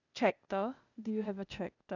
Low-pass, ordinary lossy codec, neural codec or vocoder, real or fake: 7.2 kHz; none; codec, 16 kHz, 0.8 kbps, ZipCodec; fake